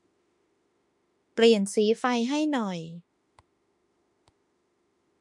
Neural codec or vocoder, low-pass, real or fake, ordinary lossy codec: autoencoder, 48 kHz, 32 numbers a frame, DAC-VAE, trained on Japanese speech; 10.8 kHz; fake; MP3, 64 kbps